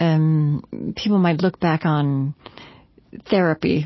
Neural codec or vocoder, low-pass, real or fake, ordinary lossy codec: none; 7.2 kHz; real; MP3, 24 kbps